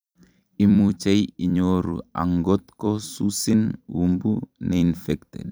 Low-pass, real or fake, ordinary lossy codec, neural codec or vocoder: none; fake; none; vocoder, 44.1 kHz, 128 mel bands every 256 samples, BigVGAN v2